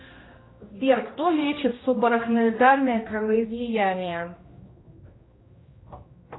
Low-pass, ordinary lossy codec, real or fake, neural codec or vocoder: 7.2 kHz; AAC, 16 kbps; fake; codec, 16 kHz, 1 kbps, X-Codec, HuBERT features, trained on general audio